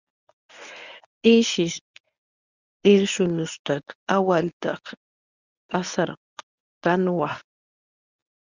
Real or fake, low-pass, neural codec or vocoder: fake; 7.2 kHz; codec, 24 kHz, 0.9 kbps, WavTokenizer, medium speech release version 1